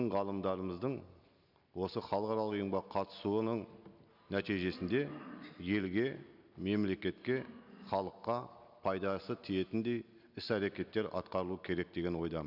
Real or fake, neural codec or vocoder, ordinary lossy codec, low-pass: real; none; none; 5.4 kHz